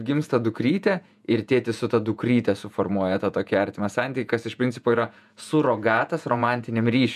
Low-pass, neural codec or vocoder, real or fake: 14.4 kHz; vocoder, 48 kHz, 128 mel bands, Vocos; fake